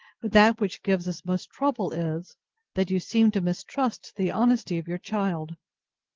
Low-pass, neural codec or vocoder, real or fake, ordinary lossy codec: 7.2 kHz; none; real; Opus, 16 kbps